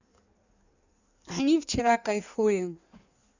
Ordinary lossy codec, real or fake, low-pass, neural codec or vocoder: none; fake; 7.2 kHz; codec, 16 kHz in and 24 kHz out, 1.1 kbps, FireRedTTS-2 codec